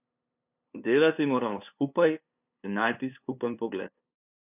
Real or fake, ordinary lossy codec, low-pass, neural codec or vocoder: fake; none; 3.6 kHz; codec, 16 kHz, 2 kbps, FunCodec, trained on LibriTTS, 25 frames a second